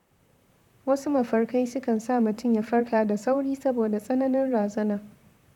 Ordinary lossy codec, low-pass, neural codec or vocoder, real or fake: none; 19.8 kHz; vocoder, 44.1 kHz, 128 mel bands, Pupu-Vocoder; fake